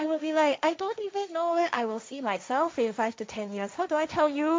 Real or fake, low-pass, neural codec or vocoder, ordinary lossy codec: fake; none; codec, 16 kHz, 1.1 kbps, Voila-Tokenizer; none